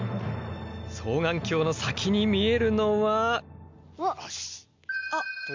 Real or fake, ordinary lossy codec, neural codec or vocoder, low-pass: real; MP3, 64 kbps; none; 7.2 kHz